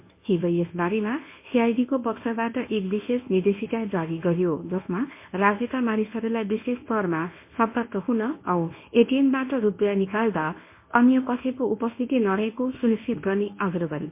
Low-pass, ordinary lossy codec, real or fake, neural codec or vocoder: 3.6 kHz; MP3, 24 kbps; fake; codec, 24 kHz, 0.9 kbps, WavTokenizer, medium speech release version 1